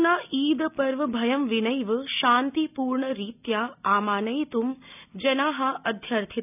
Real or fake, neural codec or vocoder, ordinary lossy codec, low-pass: real; none; none; 3.6 kHz